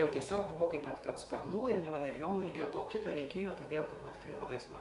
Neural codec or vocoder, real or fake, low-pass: codec, 24 kHz, 1 kbps, SNAC; fake; 10.8 kHz